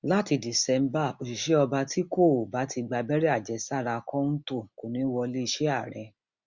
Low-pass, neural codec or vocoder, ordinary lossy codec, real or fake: 7.2 kHz; none; Opus, 64 kbps; real